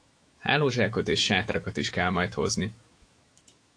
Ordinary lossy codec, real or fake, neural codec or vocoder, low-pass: AAC, 48 kbps; fake; autoencoder, 48 kHz, 128 numbers a frame, DAC-VAE, trained on Japanese speech; 9.9 kHz